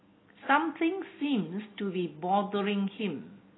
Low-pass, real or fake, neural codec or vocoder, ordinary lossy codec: 7.2 kHz; real; none; AAC, 16 kbps